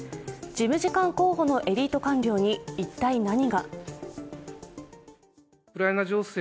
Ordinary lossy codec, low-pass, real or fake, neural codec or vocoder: none; none; real; none